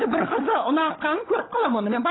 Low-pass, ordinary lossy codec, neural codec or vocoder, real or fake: 7.2 kHz; AAC, 16 kbps; codec, 16 kHz, 16 kbps, FunCodec, trained on LibriTTS, 50 frames a second; fake